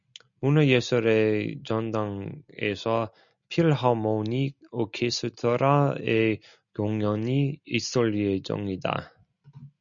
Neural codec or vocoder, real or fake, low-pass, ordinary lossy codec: none; real; 7.2 kHz; MP3, 96 kbps